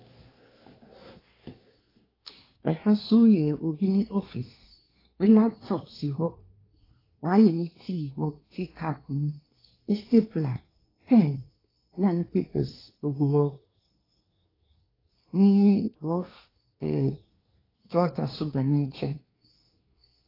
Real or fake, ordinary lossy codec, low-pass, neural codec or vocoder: fake; AAC, 24 kbps; 5.4 kHz; codec, 24 kHz, 1 kbps, SNAC